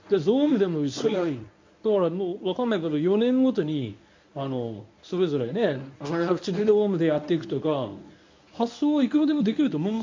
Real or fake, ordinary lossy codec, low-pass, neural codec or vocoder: fake; MP3, 48 kbps; 7.2 kHz; codec, 24 kHz, 0.9 kbps, WavTokenizer, medium speech release version 1